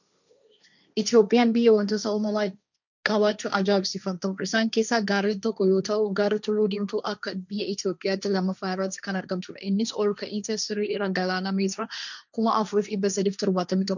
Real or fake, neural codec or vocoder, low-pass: fake; codec, 16 kHz, 1.1 kbps, Voila-Tokenizer; 7.2 kHz